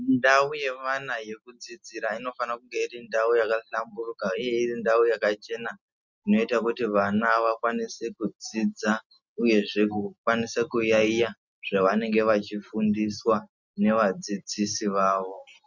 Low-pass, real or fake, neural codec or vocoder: 7.2 kHz; real; none